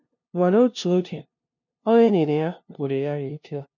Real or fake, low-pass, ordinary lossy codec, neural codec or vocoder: fake; 7.2 kHz; none; codec, 16 kHz, 0.5 kbps, FunCodec, trained on LibriTTS, 25 frames a second